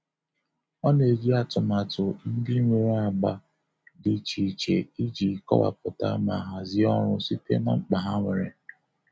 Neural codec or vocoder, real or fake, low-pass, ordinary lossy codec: none; real; none; none